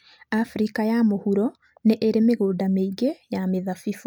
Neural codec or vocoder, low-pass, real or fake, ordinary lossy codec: none; none; real; none